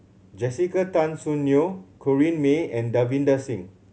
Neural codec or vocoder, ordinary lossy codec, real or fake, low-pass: none; none; real; none